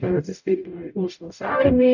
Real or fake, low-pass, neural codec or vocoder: fake; 7.2 kHz; codec, 44.1 kHz, 0.9 kbps, DAC